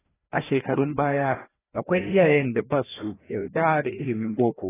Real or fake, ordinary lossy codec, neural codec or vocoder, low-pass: fake; AAC, 16 kbps; codec, 16 kHz, 1 kbps, FreqCodec, larger model; 3.6 kHz